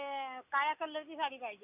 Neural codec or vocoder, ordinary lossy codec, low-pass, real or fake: none; none; 3.6 kHz; real